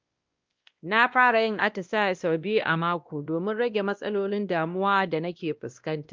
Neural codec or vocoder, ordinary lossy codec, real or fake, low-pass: codec, 16 kHz, 1 kbps, X-Codec, WavLM features, trained on Multilingual LibriSpeech; Opus, 32 kbps; fake; 7.2 kHz